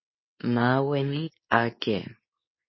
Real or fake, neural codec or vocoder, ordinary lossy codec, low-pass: fake; codec, 24 kHz, 0.9 kbps, WavTokenizer, medium speech release version 2; MP3, 24 kbps; 7.2 kHz